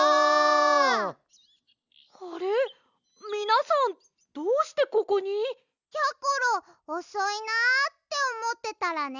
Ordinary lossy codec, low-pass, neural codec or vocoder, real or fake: none; 7.2 kHz; none; real